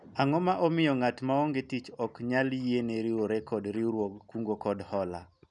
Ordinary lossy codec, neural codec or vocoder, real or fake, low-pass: none; none; real; 10.8 kHz